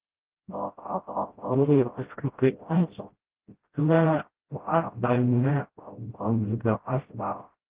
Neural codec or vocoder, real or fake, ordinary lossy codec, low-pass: codec, 16 kHz, 0.5 kbps, FreqCodec, smaller model; fake; Opus, 16 kbps; 3.6 kHz